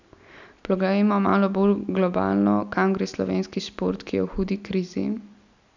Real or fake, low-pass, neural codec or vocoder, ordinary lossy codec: real; 7.2 kHz; none; none